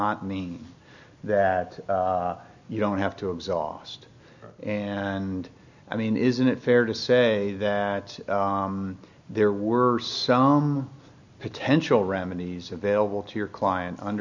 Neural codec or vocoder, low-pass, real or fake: none; 7.2 kHz; real